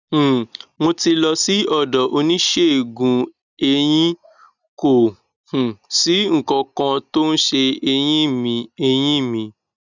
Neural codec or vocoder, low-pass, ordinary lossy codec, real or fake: none; 7.2 kHz; none; real